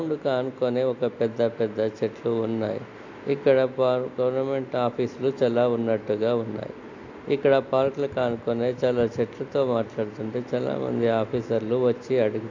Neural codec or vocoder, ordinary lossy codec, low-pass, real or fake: none; AAC, 48 kbps; 7.2 kHz; real